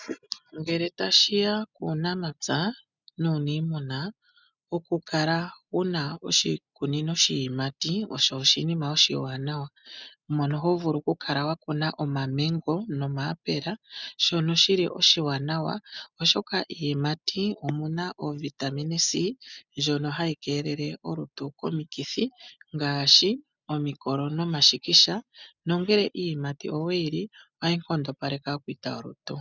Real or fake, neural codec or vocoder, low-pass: real; none; 7.2 kHz